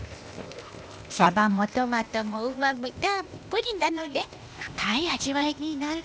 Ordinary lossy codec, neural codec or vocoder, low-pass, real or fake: none; codec, 16 kHz, 0.8 kbps, ZipCodec; none; fake